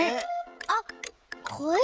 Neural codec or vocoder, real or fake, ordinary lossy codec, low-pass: codec, 16 kHz, 16 kbps, FreqCodec, smaller model; fake; none; none